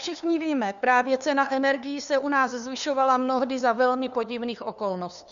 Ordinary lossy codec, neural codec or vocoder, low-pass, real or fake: Opus, 64 kbps; codec, 16 kHz, 2 kbps, FunCodec, trained on LibriTTS, 25 frames a second; 7.2 kHz; fake